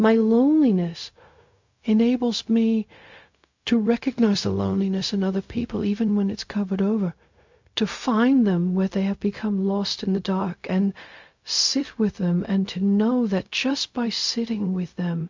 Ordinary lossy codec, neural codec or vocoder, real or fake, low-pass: MP3, 64 kbps; codec, 16 kHz, 0.4 kbps, LongCat-Audio-Codec; fake; 7.2 kHz